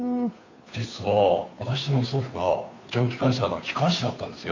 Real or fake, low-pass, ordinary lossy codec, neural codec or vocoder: fake; 7.2 kHz; AAC, 48 kbps; codec, 16 kHz, 2 kbps, FunCodec, trained on Chinese and English, 25 frames a second